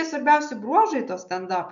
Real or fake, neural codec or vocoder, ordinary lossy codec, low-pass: real; none; MP3, 64 kbps; 7.2 kHz